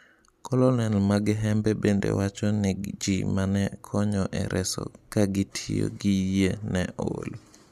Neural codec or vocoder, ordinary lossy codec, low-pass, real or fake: none; none; 14.4 kHz; real